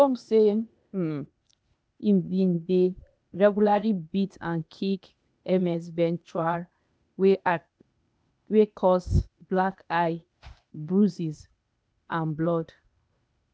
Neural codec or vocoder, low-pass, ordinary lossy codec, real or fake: codec, 16 kHz, 0.8 kbps, ZipCodec; none; none; fake